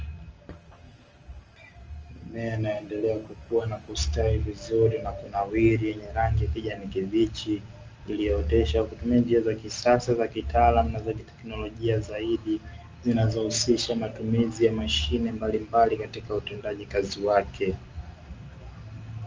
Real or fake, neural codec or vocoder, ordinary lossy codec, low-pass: real; none; Opus, 24 kbps; 7.2 kHz